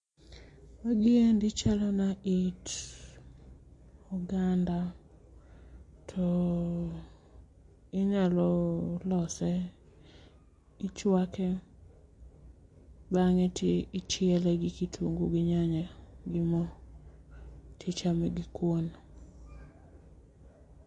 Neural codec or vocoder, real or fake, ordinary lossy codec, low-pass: none; real; MP3, 48 kbps; 10.8 kHz